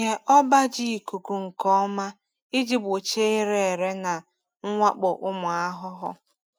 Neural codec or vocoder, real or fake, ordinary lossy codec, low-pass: none; real; none; none